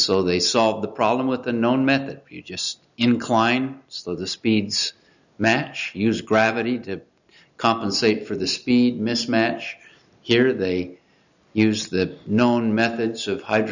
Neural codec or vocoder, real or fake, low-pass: none; real; 7.2 kHz